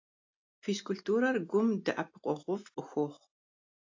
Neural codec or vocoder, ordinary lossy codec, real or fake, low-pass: none; AAC, 48 kbps; real; 7.2 kHz